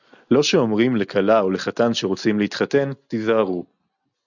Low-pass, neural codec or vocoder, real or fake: 7.2 kHz; none; real